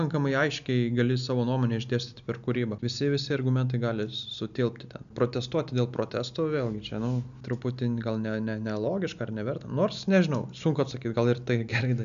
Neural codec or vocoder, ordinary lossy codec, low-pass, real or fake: none; AAC, 96 kbps; 7.2 kHz; real